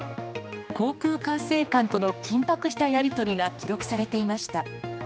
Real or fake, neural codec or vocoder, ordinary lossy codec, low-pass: fake; codec, 16 kHz, 2 kbps, X-Codec, HuBERT features, trained on general audio; none; none